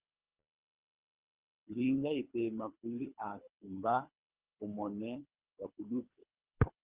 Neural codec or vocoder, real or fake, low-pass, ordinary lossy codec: codec, 24 kHz, 3 kbps, HILCodec; fake; 3.6 kHz; Opus, 32 kbps